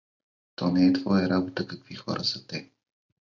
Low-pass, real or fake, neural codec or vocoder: 7.2 kHz; real; none